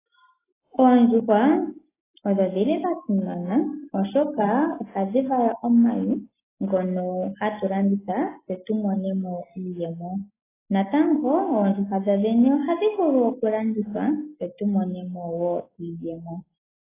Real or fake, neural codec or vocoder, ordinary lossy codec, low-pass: real; none; AAC, 16 kbps; 3.6 kHz